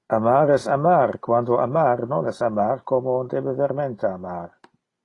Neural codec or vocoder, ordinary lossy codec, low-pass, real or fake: none; AAC, 32 kbps; 10.8 kHz; real